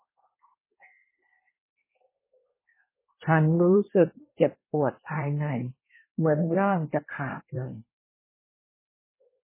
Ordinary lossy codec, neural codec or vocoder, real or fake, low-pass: MP3, 16 kbps; codec, 24 kHz, 1 kbps, SNAC; fake; 3.6 kHz